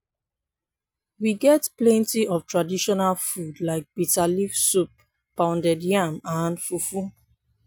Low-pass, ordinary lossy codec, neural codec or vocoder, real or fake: none; none; none; real